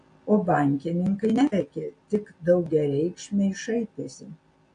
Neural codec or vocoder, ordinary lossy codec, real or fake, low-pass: none; AAC, 48 kbps; real; 9.9 kHz